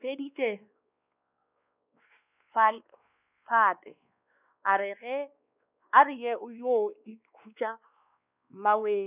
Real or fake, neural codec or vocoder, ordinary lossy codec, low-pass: fake; codec, 16 kHz, 2 kbps, X-Codec, WavLM features, trained on Multilingual LibriSpeech; none; 3.6 kHz